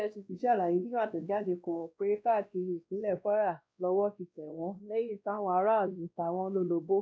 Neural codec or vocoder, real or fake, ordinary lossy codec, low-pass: codec, 16 kHz, 1 kbps, X-Codec, WavLM features, trained on Multilingual LibriSpeech; fake; none; none